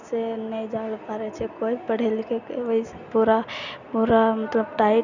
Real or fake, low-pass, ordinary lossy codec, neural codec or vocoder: real; 7.2 kHz; none; none